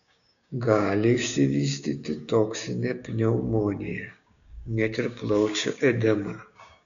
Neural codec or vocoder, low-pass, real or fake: codec, 16 kHz, 6 kbps, DAC; 7.2 kHz; fake